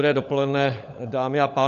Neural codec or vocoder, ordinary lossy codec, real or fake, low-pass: codec, 16 kHz, 16 kbps, FunCodec, trained on LibriTTS, 50 frames a second; AAC, 96 kbps; fake; 7.2 kHz